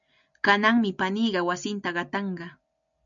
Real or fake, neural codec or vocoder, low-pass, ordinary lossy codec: real; none; 7.2 kHz; MP3, 96 kbps